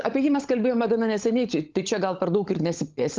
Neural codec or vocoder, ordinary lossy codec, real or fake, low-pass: codec, 16 kHz, 16 kbps, FunCodec, trained on LibriTTS, 50 frames a second; Opus, 32 kbps; fake; 7.2 kHz